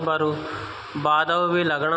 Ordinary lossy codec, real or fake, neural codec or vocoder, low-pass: none; real; none; none